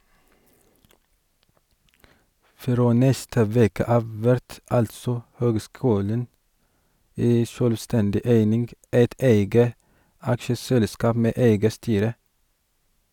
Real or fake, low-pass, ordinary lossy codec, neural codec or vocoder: real; 19.8 kHz; none; none